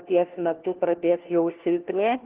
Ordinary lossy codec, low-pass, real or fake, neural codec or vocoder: Opus, 16 kbps; 3.6 kHz; fake; codec, 16 kHz, 1 kbps, FunCodec, trained on LibriTTS, 50 frames a second